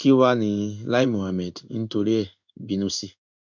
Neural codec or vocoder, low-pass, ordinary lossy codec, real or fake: codec, 16 kHz in and 24 kHz out, 1 kbps, XY-Tokenizer; 7.2 kHz; none; fake